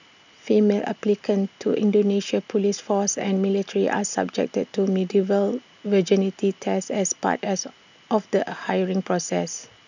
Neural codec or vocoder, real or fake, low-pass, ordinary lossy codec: none; real; 7.2 kHz; none